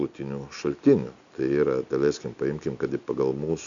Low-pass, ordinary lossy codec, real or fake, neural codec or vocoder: 7.2 kHz; AAC, 64 kbps; real; none